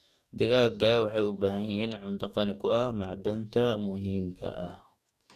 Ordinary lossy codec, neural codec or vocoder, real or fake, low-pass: none; codec, 44.1 kHz, 2.6 kbps, DAC; fake; 14.4 kHz